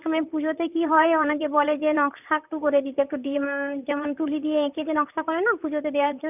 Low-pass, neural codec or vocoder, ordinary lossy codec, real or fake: 3.6 kHz; vocoder, 44.1 kHz, 128 mel bands, Pupu-Vocoder; none; fake